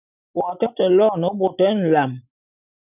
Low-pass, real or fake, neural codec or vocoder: 3.6 kHz; real; none